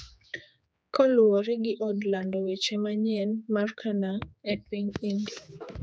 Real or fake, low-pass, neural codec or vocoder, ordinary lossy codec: fake; none; codec, 16 kHz, 4 kbps, X-Codec, HuBERT features, trained on general audio; none